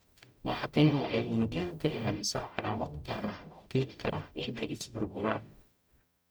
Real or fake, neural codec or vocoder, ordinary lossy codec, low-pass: fake; codec, 44.1 kHz, 0.9 kbps, DAC; none; none